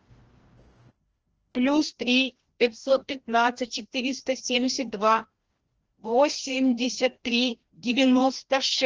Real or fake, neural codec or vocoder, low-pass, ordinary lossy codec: fake; codec, 16 kHz, 1 kbps, FreqCodec, larger model; 7.2 kHz; Opus, 16 kbps